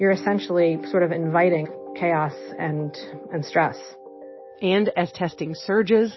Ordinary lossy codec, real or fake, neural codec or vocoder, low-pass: MP3, 24 kbps; real; none; 7.2 kHz